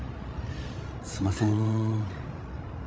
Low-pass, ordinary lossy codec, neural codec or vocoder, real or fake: none; none; codec, 16 kHz, 16 kbps, FreqCodec, larger model; fake